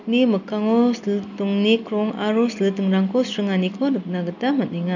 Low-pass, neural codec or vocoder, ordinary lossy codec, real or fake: 7.2 kHz; none; none; real